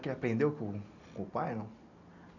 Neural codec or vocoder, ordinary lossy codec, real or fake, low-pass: none; none; real; 7.2 kHz